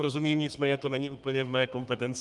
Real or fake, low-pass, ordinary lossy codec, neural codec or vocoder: fake; 10.8 kHz; Opus, 32 kbps; codec, 32 kHz, 1.9 kbps, SNAC